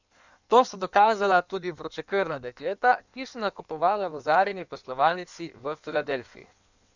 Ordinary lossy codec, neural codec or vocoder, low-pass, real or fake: none; codec, 16 kHz in and 24 kHz out, 1.1 kbps, FireRedTTS-2 codec; 7.2 kHz; fake